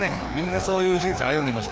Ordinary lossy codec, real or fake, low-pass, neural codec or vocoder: none; fake; none; codec, 16 kHz, 2 kbps, FreqCodec, larger model